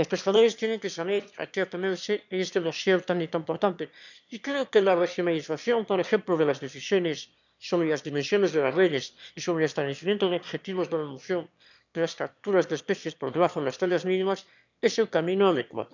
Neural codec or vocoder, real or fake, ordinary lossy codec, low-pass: autoencoder, 22.05 kHz, a latent of 192 numbers a frame, VITS, trained on one speaker; fake; none; 7.2 kHz